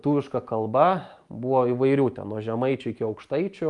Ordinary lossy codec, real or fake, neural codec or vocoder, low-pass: Opus, 32 kbps; real; none; 10.8 kHz